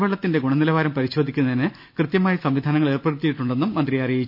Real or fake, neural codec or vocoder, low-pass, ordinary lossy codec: real; none; 5.4 kHz; none